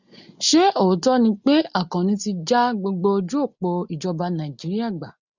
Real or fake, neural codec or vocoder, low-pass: real; none; 7.2 kHz